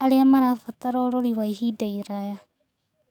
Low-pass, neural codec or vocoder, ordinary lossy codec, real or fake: 19.8 kHz; codec, 44.1 kHz, 7.8 kbps, DAC; none; fake